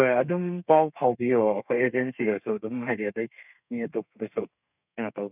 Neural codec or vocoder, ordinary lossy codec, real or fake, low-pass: codec, 32 kHz, 1.9 kbps, SNAC; none; fake; 3.6 kHz